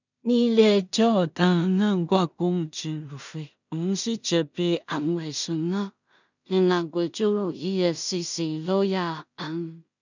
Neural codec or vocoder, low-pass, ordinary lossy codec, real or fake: codec, 16 kHz in and 24 kHz out, 0.4 kbps, LongCat-Audio-Codec, two codebook decoder; 7.2 kHz; none; fake